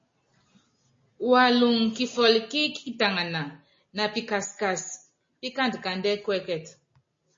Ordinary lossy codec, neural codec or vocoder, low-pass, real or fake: MP3, 32 kbps; none; 7.2 kHz; real